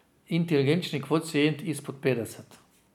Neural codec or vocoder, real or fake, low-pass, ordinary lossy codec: none; real; 19.8 kHz; none